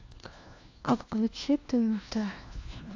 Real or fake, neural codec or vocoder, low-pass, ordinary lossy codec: fake; codec, 16 kHz, 1 kbps, FunCodec, trained on LibriTTS, 50 frames a second; 7.2 kHz; AAC, 48 kbps